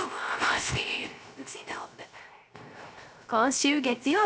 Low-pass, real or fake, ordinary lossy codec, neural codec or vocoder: none; fake; none; codec, 16 kHz, 0.3 kbps, FocalCodec